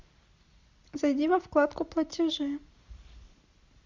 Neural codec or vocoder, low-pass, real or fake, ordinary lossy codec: none; 7.2 kHz; real; MP3, 64 kbps